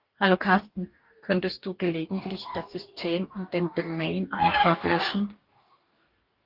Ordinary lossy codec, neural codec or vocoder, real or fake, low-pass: Opus, 32 kbps; codec, 44.1 kHz, 2.6 kbps, DAC; fake; 5.4 kHz